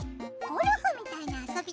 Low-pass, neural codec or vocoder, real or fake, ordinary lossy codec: none; none; real; none